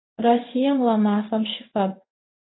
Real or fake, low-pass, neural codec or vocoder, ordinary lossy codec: fake; 7.2 kHz; codec, 16 kHz in and 24 kHz out, 1 kbps, XY-Tokenizer; AAC, 16 kbps